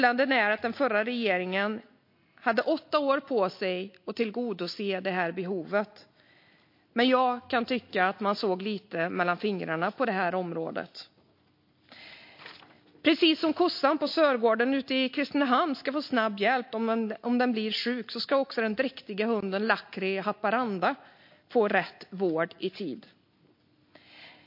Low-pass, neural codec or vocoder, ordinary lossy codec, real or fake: 5.4 kHz; none; MP3, 32 kbps; real